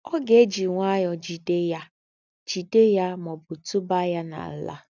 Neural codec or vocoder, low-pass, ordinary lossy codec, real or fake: none; 7.2 kHz; none; real